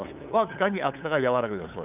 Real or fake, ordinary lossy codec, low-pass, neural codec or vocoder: fake; none; 3.6 kHz; codec, 16 kHz, 8 kbps, FunCodec, trained on LibriTTS, 25 frames a second